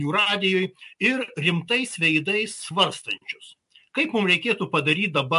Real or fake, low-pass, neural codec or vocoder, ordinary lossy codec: real; 10.8 kHz; none; MP3, 64 kbps